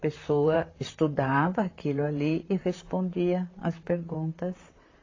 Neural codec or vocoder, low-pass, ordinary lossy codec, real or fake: vocoder, 44.1 kHz, 128 mel bands, Pupu-Vocoder; 7.2 kHz; AAC, 32 kbps; fake